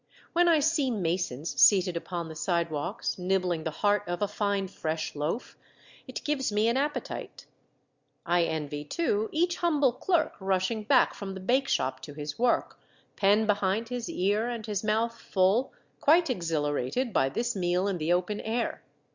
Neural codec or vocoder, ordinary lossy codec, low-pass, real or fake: none; Opus, 64 kbps; 7.2 kHz; real